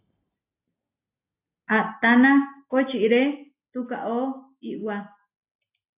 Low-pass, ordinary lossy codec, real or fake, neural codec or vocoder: 3.6 kHz; AAC, 32 kbps; real; none